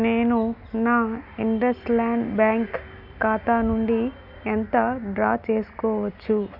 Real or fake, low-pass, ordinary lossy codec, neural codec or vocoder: real; 5.4 kHz; none; none